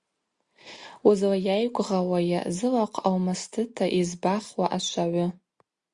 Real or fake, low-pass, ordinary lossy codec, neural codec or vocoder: real; 10.8 kHz; Opus, 64 kbps; none